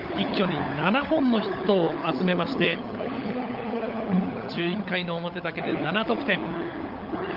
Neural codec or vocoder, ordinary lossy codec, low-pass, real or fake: codec, 16 kHz, 16 kbps, FunCodec, trained on LibriTTS, 50 frames a second; Opus, 32 kbps; 5.4 kHz; fake